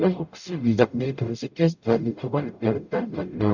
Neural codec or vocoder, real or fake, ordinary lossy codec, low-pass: codec, 44.1 kHz, 0.9 kbps, DAC; fake; none; 7.2 kHz